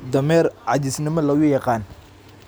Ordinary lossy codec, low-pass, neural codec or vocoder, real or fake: none; none; none; real